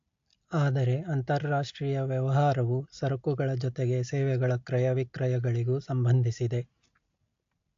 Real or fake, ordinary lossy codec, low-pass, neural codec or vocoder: real; AAC, 48 kbps; 7.2 kHz; none